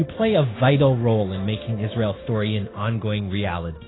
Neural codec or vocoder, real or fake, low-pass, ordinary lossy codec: codec, 16 kHz, 0.9 kbps, LongCat-Audio-Codec; fake; 7.2 kHz; AAC, 16 kbps